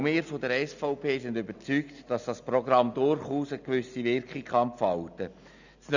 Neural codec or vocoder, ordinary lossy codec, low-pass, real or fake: none; none; 7.2 kHz; real